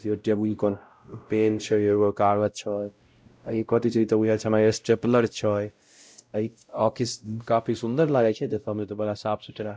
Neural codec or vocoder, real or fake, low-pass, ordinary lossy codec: codec, 16 kHz, 0.5 kbps, X-Codec, WavLM features, trained on Multilingual LibriSpeech; fake; none; none